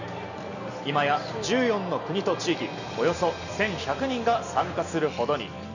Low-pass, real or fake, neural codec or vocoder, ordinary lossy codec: 7.2 kHz; real; none; none